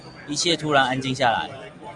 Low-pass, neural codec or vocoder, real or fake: 10.8 kHz; none; real